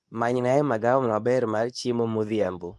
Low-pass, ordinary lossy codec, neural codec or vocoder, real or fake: none; none; codec, 24 kHz, 0.9 kbps, WavTokenizer, medium speech release version 2; fake